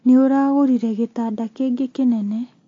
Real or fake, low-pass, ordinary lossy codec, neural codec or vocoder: real; 7.2 kHz; AAC, 32 kbps; none